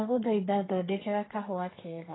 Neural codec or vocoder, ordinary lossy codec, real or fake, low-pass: codec, 32 kHz, 1.9 kbps, SNAC; AAC, 16 kbps; fake; 7.2 kHz